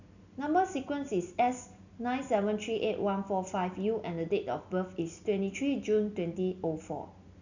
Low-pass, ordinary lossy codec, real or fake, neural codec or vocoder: 7.2 kHz; none; real; none